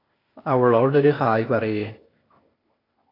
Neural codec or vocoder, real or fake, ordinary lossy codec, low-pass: codec, 16 kHz, 0.8 kbps, ZipCodec; fake; AAC, 24 kbps; 5.4 kHz